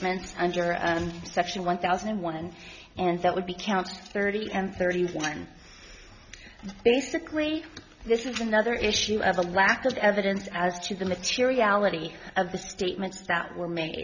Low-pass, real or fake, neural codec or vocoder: 7.2 kHz; real; none